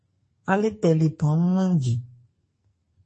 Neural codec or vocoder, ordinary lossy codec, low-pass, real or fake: codec, 44.1 kHz, 2.6 kbps, SNAC; MP3, 32 kbps; 10.8 kHz; fake